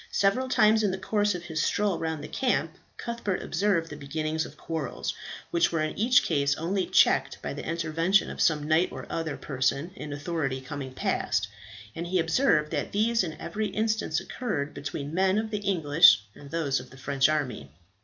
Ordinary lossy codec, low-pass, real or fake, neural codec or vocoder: MP3, 64 kbps; 7.2 kHz; real; none